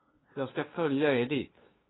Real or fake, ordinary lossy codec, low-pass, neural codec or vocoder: fake; AAC, 16 kbps; 7.2 kHz; codec, 16 kHz, 2 kbps, FunCodec, trained on LibriTTS, 25 frames a second